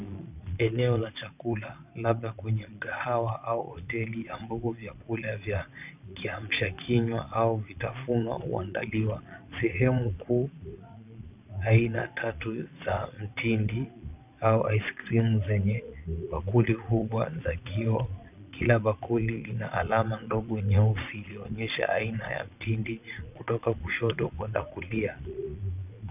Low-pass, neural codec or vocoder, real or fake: 3.6 kHz; vocoder, 22.05 kHz, 80 mel bands, Vocos; fake